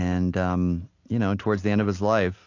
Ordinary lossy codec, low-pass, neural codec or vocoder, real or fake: AAC, 48 kbps; 7.2 kHz; none; real